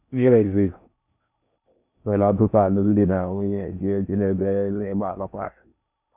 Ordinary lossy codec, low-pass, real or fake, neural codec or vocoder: none; 3.6 kHz; fake; codec, 16 kHz in and 24 kHz out, 0.6 kbps, FocalCodec, streaming, 4096 codes